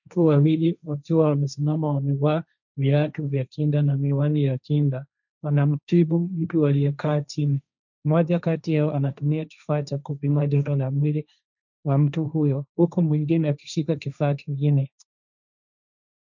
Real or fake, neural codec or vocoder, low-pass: fake; codec, 16 kHz, 1.1 kbps, Voila-Tokenizer; 7.2 kHz